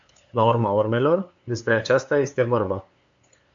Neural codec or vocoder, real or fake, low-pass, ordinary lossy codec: codec, 16 kHz, 2 kbps, FunCodec, trained on LibriTTS, 25 frames a second; fake; 7.2 kHz; AAC, 64 kbps